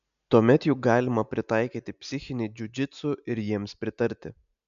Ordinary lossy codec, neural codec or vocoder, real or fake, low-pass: MP3, 96 kbps; none; real; 7.2 kHz